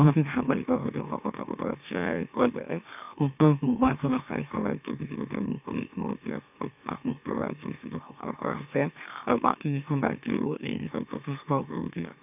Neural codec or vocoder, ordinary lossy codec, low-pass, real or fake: autoencoder, 44.1 kHz, a latent of 192 numbers a frame, MeloTTS; none; 3.6 kHz; fake